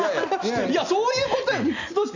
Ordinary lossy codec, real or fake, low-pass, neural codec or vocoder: none; real; 7.2 kHz; none